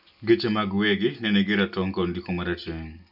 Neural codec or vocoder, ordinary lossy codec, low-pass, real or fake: none; none; 5.4 kHz; real